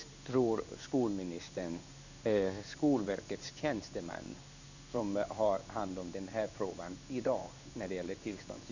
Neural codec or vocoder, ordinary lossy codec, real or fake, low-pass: codec, 16 kHz in and 24 kHz out, 1 kbps, XY-Tokenizer; none; fake; 7.2 kHz